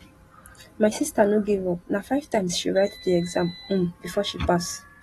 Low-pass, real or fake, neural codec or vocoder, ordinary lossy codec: 19.8 kHz; real; none; AAC, 32 kbps